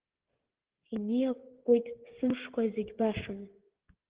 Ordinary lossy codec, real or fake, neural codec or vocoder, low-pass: Opus, 16 kbps; fake; codec, 16 kHz, 16 kbps, FreqCodec, smaller model; 3.6 kHz